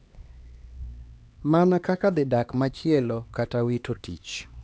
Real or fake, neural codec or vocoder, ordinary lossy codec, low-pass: fake; codec, 16 kHz, 2 kbps, X-Codec, HuBERT features, trained on LibriSpeech; none; none